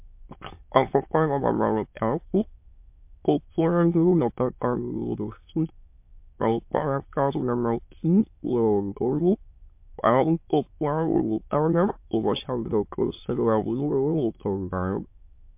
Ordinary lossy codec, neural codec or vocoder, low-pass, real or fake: MP3, 32 kbps; autoencoder, 22.05 kHz, a latent of 192 numbers a frame, VITS, trained on many speakers; 3.6 kHz; fake